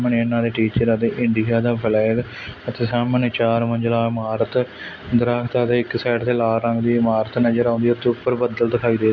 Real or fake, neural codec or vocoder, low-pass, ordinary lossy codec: real; none; 7.2 kHz; none